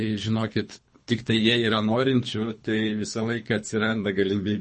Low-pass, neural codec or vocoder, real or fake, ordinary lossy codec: 10.8 kHz; codec, 24 kHz, 3 kbps, HILCodec; fake; MP3, 32 kbps